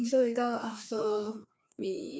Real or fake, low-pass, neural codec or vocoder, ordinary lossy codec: fake; none; codec, 16 kHz, 2 kbps, FreqCodec, larger model; none